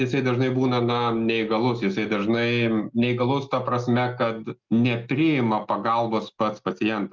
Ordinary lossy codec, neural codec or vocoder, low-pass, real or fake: Opus, 24 kbps; none; 7.2 kHz; real